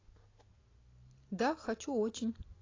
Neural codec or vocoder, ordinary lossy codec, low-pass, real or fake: none; AAC, 32 kbps; 7.2 kHz; real